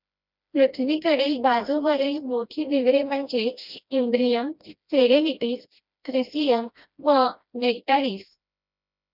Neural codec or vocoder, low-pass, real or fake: codec, 16 kHz, 1 kbps, FreqCodec, smaller model; 5.4 kHz; fake